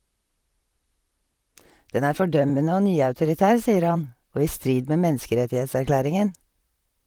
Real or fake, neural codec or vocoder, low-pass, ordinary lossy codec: fake; vocoder, 44.1 kHz, 128 mel bands, Pupu-Vocoder; 19.8 kHz; Opus, 24 kbps